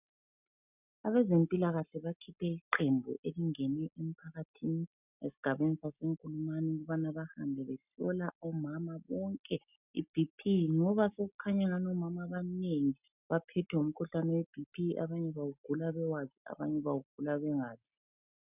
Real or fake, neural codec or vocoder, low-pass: real; none; 3.6 kHz